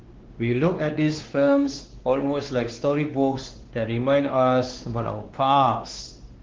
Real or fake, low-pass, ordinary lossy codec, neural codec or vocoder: fake; 7.2 kHz; Opus, 16 kbps; codec, 16 kHz, 2 kbps, X-Codec, WavLM features, trained on Multilingual LibriSpeech